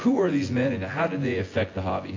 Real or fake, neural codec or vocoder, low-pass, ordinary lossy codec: fake; vocoder, 24 kHz, 100 mel bands, Vocos; 7.2 kHz; AAC, 32 kbps